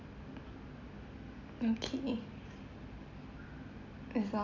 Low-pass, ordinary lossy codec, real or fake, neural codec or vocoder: 7.2 kHz; none; real; none